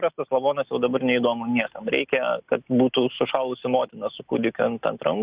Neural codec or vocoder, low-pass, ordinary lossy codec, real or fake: none; 3.6 kHz; Opus, 64 kbps; real